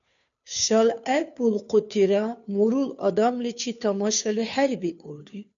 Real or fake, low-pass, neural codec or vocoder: fake; 7.2 kHz; codec, 16 kHz, 2 kbps, FunCodec, trained on Chinese and English, 25 frames a second